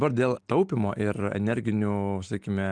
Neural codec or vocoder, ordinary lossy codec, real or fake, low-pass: none; Opus, 32 kbps; real; 9.9 kHz